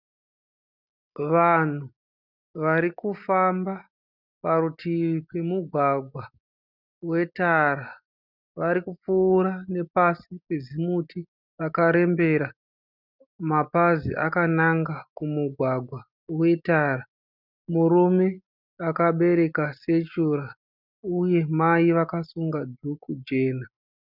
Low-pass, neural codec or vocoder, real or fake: 5.4 kHz; none; real